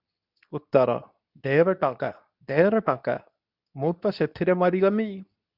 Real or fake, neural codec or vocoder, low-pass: fake; codec, 24 kHz, 0.9 kbps, WavTokenizer, medium speech release version 2; 5.4 kHz